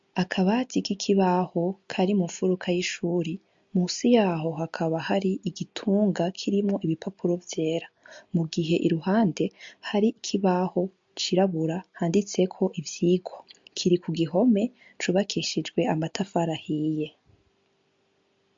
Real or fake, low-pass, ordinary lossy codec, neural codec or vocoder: real; 7.2 kHz; MP3, 48 kbps; none